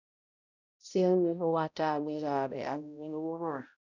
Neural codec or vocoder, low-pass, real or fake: codec, 16 kHz, 0.5 kbps, X-Codec, HuBERT features, trained on balanced general audio; 7.2 kHz; fake